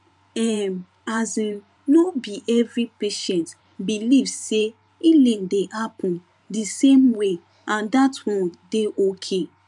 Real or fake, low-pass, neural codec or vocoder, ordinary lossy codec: fake; 10.8 kHz; vocoder, 44.1 kHz, 128 mel bands every 512 samples, BigVGAN v2; none